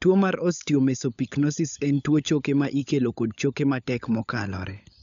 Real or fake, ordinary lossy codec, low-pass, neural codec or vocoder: fake; MP3, 96 kbps; 7.2 kHz; codec, 16 kHz, 16 kbps, FunCodec, trained on LibriTTS, 50 frames a second